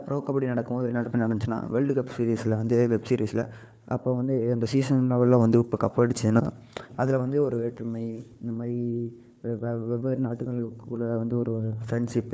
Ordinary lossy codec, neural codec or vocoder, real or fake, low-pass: none; codec, 16 kHz, 4 kbps, FunCodec, trained on Chinese and English, 50 frames a second; fake; none